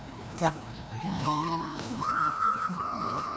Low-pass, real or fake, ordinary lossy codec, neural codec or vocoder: none; fake; none; codec, 16 kHz, 1 kbps, FreqCodec, larger model